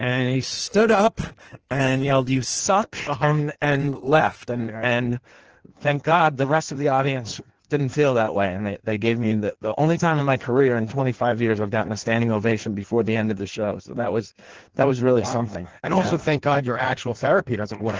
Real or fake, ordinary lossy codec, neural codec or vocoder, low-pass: fake; Opus, 16 kbps; codec, 16 kHz in and 24 kHz out, 1.1 kbps, FireRedTTS-2 codec; 7.2 kHz